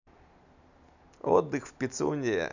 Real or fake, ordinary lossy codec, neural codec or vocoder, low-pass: real; none; none; 7.2 kHz